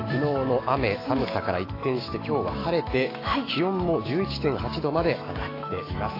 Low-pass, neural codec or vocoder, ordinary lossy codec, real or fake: 5.4 kHz; autoencoder, 48 kHz, 128 numbers a frame, DAC-VAE, trained on Japanese speech; AAC, 24 kbps; fake